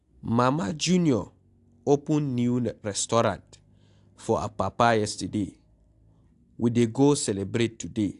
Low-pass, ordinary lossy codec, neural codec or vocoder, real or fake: 10.8 kHz; AAC, 64 kbps; none; real